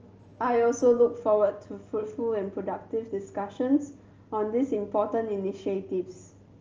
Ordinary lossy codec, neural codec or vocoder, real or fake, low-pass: Opus, 24 kbps; none; real; 7.2 kHz